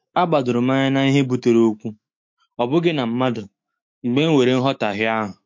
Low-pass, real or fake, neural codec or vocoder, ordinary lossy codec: 7.2 kHz; fake; autoencoder, 48 kHz, 128 numbers a frame, DAC-VAE, trained on Japanese speech; MP3, 48 kbps